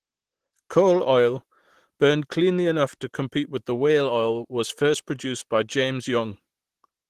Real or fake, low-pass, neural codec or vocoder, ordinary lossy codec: fake; 14.4 kHz; vocoder, 44.1 kHz, 128 mel bands, Pupu-Vocoder; Opus, 16 kbps